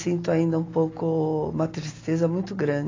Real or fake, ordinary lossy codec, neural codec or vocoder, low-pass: real; AAC, 32 kbps; none; 7.2 kHz